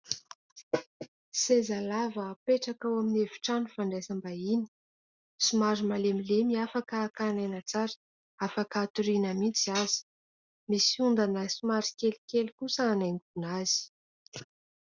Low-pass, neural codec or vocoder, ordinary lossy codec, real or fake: 7.2 kHz; none; Opus, 64 kbps; real